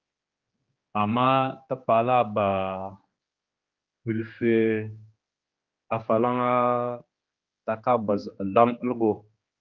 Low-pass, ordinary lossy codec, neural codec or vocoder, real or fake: 7.2 kHz; Opus, 32 kbps; codec, 16 kHz, 2 kbps, X-Codec, HuBERT features, trained on general audio; fake